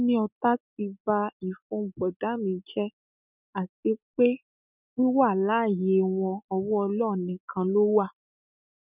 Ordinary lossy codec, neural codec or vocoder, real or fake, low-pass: none; none; real; 3.6 kHz